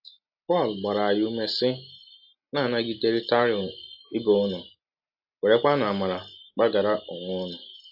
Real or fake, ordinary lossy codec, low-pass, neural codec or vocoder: real; AAC, 48 kbps; 5.4 kHz; none